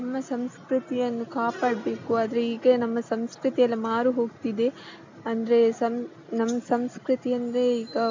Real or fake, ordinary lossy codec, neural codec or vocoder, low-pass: real; MP3, 64 kbps; none; 7.2 kHz